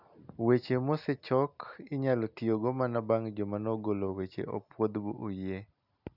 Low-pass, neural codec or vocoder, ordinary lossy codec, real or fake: 5.4 kHz; none; none; real